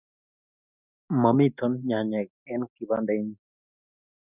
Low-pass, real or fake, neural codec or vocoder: 3.6 kHz; real; none